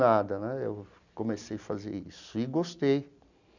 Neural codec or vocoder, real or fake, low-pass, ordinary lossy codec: none; real; 7.2 kHz; none